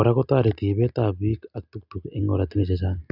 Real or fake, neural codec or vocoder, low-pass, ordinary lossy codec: real; none; 5.4 kHz; none